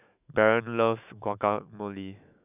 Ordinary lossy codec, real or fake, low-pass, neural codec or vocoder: none; fake; 3.6 kHz; autoencoder, 48 kHz, 128 numbers a frame, DAC-VAE, trained on Japanese speech